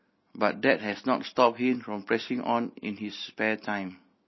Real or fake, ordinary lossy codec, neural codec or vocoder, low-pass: real; MP3, 24 kbps; none; 7.2 kHz